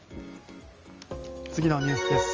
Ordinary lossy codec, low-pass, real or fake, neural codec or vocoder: Opus, 24 kbps; 7.2 kHz; real; none